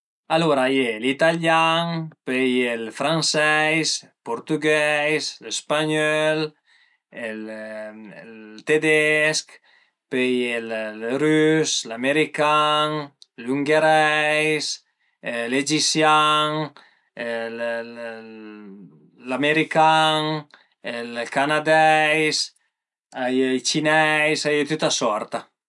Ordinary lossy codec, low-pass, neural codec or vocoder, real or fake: none; 10.8 kHz; none; real